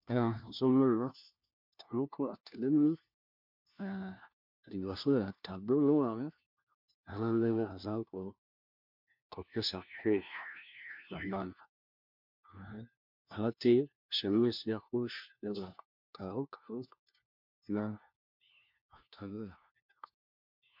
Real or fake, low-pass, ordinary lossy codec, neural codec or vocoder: fake; 5.4 kHz; MP3, 48 kbps; codec, 16 kHz, 1 kbps, FunCodec, trained on LibriTTS, 50 frames a second